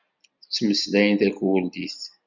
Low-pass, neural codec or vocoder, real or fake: 7.2 kHz; none; real